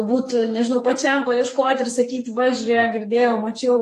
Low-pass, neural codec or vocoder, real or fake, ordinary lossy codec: 14.4 kHz; codec, 44.1 kHz, 2.6 kbps, SNAC; fake; AAC, 48 kbps